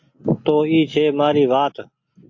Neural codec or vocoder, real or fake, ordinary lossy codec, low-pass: none; real; AAC, 48 kbps; 7.2 kHz